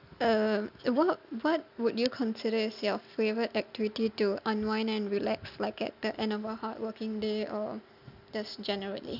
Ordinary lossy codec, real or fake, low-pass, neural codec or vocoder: none; real; 5.4 kHz; none